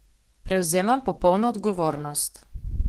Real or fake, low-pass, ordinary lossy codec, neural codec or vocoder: fake; 14.4 kHz; Opus, 16 kbps; codec, 32 kHz, 1.9 kbps, SNAC